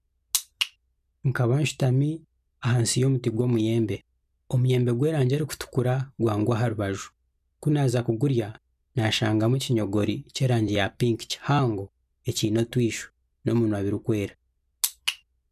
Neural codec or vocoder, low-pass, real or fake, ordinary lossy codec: none; 14.4 kHz; real; none